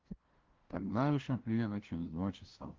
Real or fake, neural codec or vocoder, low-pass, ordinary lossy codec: fake; codec, 16 kHz, 0.5 kbps, FunCodec, trained on LibriTTS, 25 frames a second; 7.2 kHz; Opus, 16 kbps